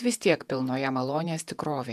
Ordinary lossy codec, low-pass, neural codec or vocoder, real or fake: MP3, 96 kbps; 14.4 kHz; vocoder, 48 kHz, 128 mel bands, Vocos; fake